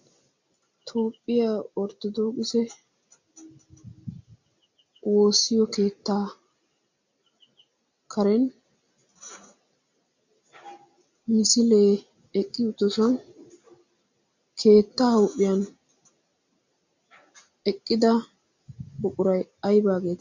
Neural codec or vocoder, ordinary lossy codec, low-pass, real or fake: none; MP3, 48 kbps; 7.2 kHz; real